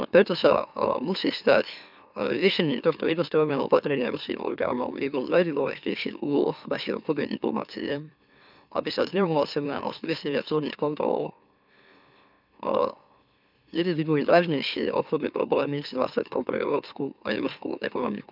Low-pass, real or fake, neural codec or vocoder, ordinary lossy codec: 5.4 kHz; fake; autoencoder, 44.1 kHz, a latent of 192 numbers a frame, MeloTTS; none